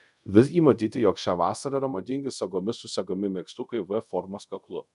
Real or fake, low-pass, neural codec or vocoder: fake; 10.8 kHz; codec, 24 kHz, 0.5 kbps, DualCodec